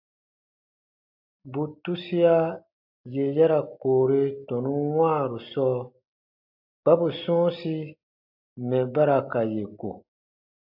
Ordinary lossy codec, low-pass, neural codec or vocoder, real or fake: AAC, 48 kbps; 5.4 kHz; none; real